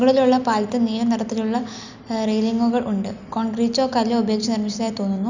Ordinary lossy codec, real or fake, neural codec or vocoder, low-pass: none; real; none; 7.2 kHz